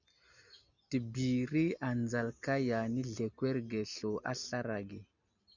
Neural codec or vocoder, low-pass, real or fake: none; 7.2 kHz; real